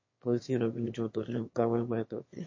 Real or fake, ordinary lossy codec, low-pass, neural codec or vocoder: fake; MP3, 32 kbps; 7.2 kHz; autoencoder, 22.05 kHz, a latent of 192 numbers a frame, VITS, trained on one speaker